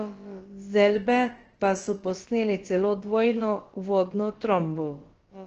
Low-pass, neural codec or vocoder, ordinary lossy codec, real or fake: 7.2 kHz; codec, 16 kHz, about 1 kbps, DyCAST, with the encoder's durations; Opus, 16 kbps; fake